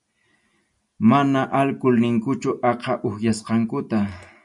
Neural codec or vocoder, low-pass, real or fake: none; 10.8 kHz; real